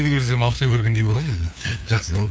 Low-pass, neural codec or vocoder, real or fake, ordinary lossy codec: none; codec, 16 kHz, 2 kbps, FunCodec, trained on LibriTTS, 25 frames a second; fake; none